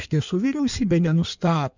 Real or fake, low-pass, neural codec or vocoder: fake; 7.2 kHz; codec, 16 kHz in and 24 kHz out, 1.1 kbps, FireRedTTS-2 codec